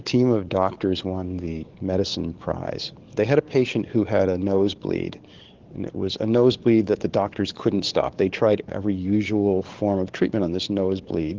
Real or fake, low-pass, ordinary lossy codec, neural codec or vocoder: fake; 7.2 kHz; Opus, 16 kbps; codec, 44.1 kHz, 7.8 kbps, DAC